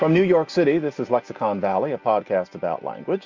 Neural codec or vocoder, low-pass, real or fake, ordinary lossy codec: none; 7.2 kHz; real; MP3, 64 kbps